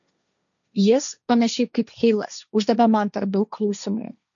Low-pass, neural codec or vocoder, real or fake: 7.2 kHz; codec, 16 kHz, 1.1 kbps, Voila-Tokenizer; fake